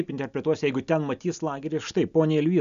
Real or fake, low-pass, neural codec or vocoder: real; 7.2 kHz; none